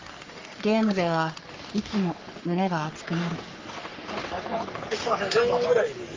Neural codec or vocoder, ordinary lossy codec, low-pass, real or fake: codec, 44.1 kHz, 3.4 kbps, Pupu-Codec; Opus, 32 kbps; 7.2 kHz; fake